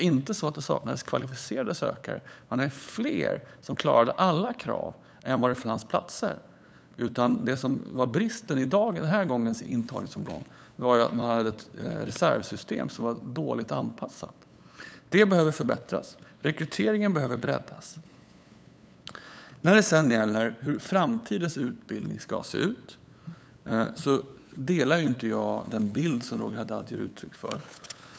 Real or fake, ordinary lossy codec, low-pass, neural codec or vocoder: fake; none; none; codec, 16 kHz, 8 kbps, FunCodec, trained on LibriTTS, 25 frames a second